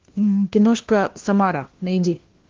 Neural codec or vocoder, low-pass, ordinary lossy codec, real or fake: codec, 16 kHz, 1 kbps, FunCodec, trained on LibriTTS, 50 frames a second; 7.2 kHz; Opus, 16 kbps; fake